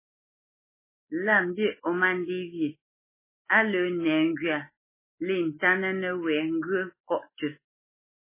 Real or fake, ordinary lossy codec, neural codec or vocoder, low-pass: real; MP3, 16 kbps; none; 3.6 kHz